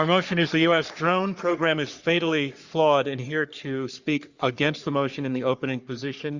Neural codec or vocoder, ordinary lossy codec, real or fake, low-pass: codec, 44.1 kHz, 3.4 kbps, Pupu-Codec; Opus, 64 kbps; fake; 7.2 kHz